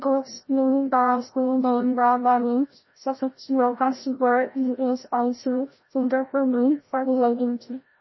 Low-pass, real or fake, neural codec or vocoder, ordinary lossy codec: 7.2 kHz; fake; codec, 16 kHz, 0.5 kbps, FreqCodec, larger model; MP3, 24 kbps